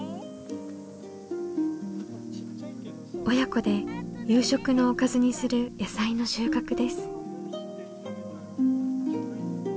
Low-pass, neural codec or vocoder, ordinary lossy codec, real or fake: none; none; none; real